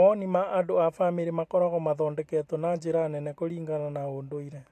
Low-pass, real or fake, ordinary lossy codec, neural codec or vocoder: 14.4 kHz; fake; AAC, 64 kbps; vocoder, 44.1 kHz, 128 mel bands every 256 samples, BigVGAN v2